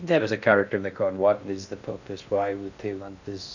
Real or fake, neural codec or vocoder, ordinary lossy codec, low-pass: fake; codec, 16 kHz in and 24 kHz out, 0.6 kbps, FocalCodec, streaming, 2048 codes; none; 7.2 kHz